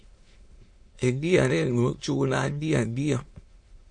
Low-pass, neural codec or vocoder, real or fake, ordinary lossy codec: 9.9 kHz; autoencoder, 22.05 kHz, a latent of 192 numbers a frame, VITS, trained on many speakers; fake; MP3, 48 kbps